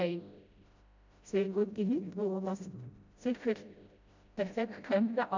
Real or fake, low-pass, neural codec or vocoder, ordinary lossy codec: fake; 7.2 kHz; codec, 16 kHz, 0.5 kbps, FreqCodec, smaller model; MP3, 64 kbps